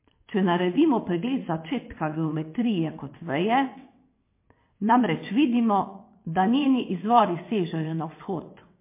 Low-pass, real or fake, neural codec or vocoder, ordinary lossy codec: 3.6 kHz; fake; vocoder, 22.05 kHz, 80 mel bands, WaveNeXt; MP3, 24 kbps